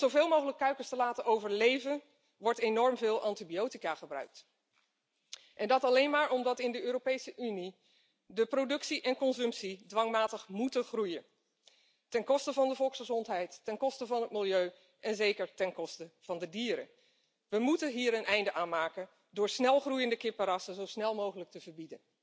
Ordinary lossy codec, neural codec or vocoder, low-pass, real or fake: none; none; none; real